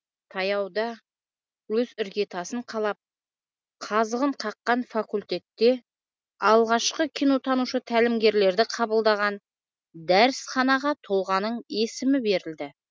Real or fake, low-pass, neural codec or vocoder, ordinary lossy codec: real; none; none; none